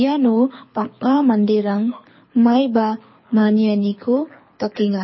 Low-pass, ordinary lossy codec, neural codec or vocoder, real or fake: 7.2 kHz; MP3, 24 kbps; codec, 24 kHz, 6 kbps, HILCodec; fake